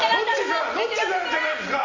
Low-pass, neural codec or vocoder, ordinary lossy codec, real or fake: 7.2 kHz; none; AAC, 48 kbps; real